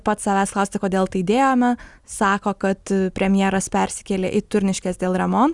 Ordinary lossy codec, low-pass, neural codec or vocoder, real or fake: Opus, 64 kbps; 10.8 kHz; none; real